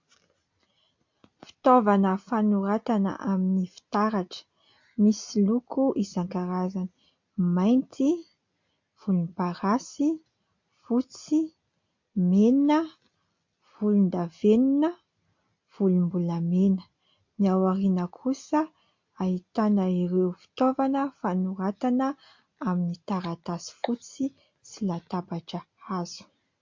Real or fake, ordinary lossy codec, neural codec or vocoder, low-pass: real; MP3, 48 kbps; none; 7.2 kHz